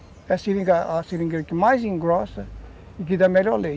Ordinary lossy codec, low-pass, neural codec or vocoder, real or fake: none; none; none; real